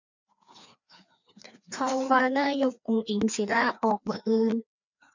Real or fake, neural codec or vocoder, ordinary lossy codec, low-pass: fake; codec, 16 kHz, 2 kbps, FreqCodec, larger model; none; 7.2 kHz